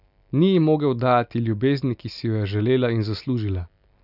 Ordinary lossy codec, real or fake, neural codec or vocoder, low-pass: none; real; none; 5.4 kHz